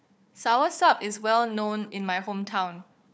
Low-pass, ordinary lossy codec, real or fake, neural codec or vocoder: none; none; fake; codec, 16 kHz, 4 kbps, FunCodec, trained on Chinese and English, 50 frames a second